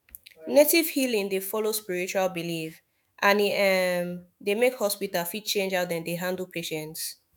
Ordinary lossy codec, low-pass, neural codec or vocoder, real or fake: none; 19.8 kHz; autoencoder, 48 kHz, 128 numbers a frame, DAC-VAE, trained on Japanese speech; fake